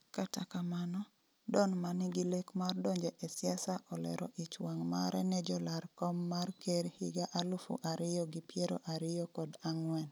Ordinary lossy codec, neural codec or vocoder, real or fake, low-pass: none; none; real; none